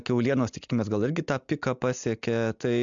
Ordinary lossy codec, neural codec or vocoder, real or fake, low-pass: MP3, 64 kbps; none; real; 7.2 kHz